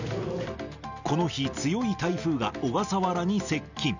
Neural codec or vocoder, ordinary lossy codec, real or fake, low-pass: none; none; real; 7.2 kHz